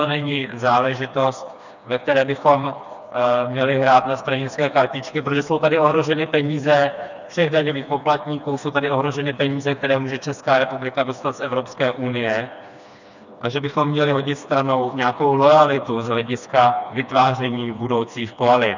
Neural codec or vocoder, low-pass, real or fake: codec, 16 kHz, 2 kbps, FreqCodec, smaller model; 7.2 kHz; fake